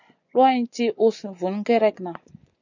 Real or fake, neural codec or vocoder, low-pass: fake; vocoder, 24 kHz, 100 mel bands, Vocos; 7.2 kHz